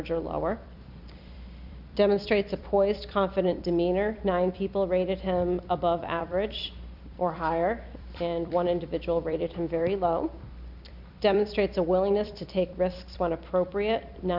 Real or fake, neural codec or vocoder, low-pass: fake; vocoder, 44.1 kHz, 128 mel bands every 256 samples, BigVGAN v2; 5.4 kHz